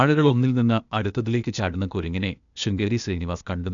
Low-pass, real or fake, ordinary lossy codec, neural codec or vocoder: 7.2 kHz; fake; none; codec, 16 kHz, 0.8 kbps, ZipCodec